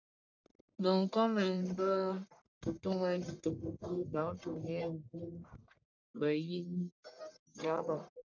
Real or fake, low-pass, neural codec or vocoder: fake; 7.2 kHz; codec, 44.1 kHz, 1.7 kbps, Pupu-Codec